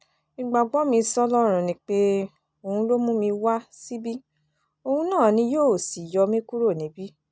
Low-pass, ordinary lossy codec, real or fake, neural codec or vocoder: none; none; real; none